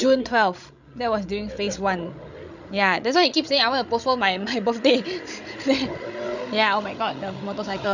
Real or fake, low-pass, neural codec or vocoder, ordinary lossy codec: fake; 7.2 kHz; codec, 16 kHz, 16 kbps, FreqCodec, larger model; none